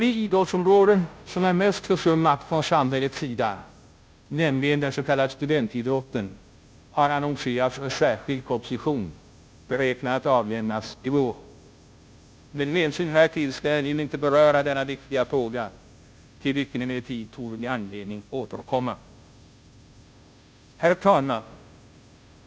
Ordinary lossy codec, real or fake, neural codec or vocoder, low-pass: none; fake; codec, 16 kHz, 0.5 kbps, FunCodec, trained on Chinese and English, 25 frames a second; none